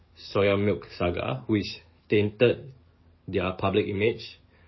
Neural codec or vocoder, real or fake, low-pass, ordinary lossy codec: codec, 44.1 kHz, 7.8 kbps, DAC; fake; 7.2 kHz; MP3, 24 kbps